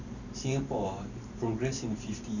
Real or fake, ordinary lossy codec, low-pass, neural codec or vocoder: fake; none; 7.2 kHz; vocoder, 44.1 kHz, 128 mel bands every 512 samples, BigVGAN v2